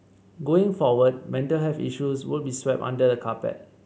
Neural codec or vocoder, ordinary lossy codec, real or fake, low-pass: none; none; real; none